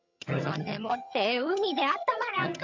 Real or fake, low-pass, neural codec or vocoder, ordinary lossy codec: fake; 7.2 kHz; vocoder, 22.05 kHz, 80 mel bands, HiFi-GAN; MP3, 64 kbps